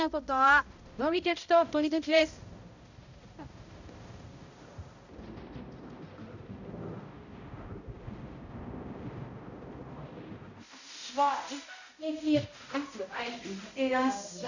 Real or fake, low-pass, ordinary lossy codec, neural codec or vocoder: fake; 7.2 kHz; none; codec, 16 kHz, 0.5 kbps, X-Codec, HuBERT features, trained on balanced general audio